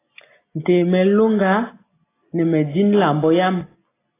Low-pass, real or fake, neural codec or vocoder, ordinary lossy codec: 3.6 kHz; real; none; AAC, 16 kbps